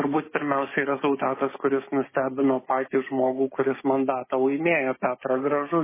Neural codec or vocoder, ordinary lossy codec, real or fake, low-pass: codec, 16 kHz, 6 kbps, DAC; MP3, 16 kbps; fake; 3.6 kHz